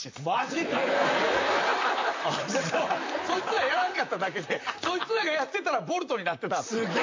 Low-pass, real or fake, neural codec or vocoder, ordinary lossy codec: 7.2 kHz; fake; vocoder, 44.1 kHz, 128 mel bands, Pupu-Vocoder; MP3, 64 kbps